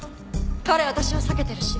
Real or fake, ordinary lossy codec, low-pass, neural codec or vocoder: real; none; none; none